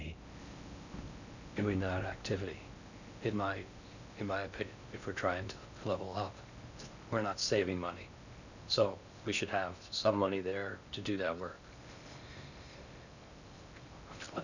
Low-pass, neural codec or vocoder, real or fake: 7.2 kHz; codec, 16 kHz in and 24 kHz out, 0.6 kbps, FocalCodec, streaming, 4096 codes; fake